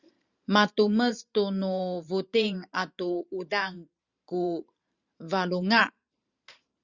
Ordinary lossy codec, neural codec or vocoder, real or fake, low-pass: Opus, 64 kbps; vocoder, 44.1 kHz, 128 mel bands every 512 samples, BigVGAN v2; fake; 7.2 kHz